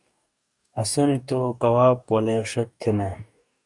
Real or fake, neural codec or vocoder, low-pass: fake; codec, 44.1 kHz, 2.6 kbps, DAC; 10.8 kHz